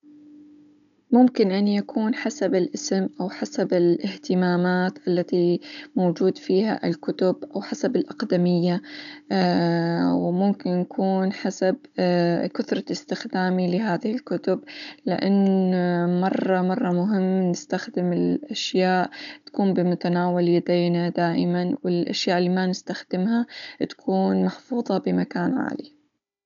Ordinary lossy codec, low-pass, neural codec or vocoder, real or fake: none; 7.2 kHz; codec, 16 kHz, 16 kbps, FunCodec, trained on Chinese and English, 50 frames a second; fake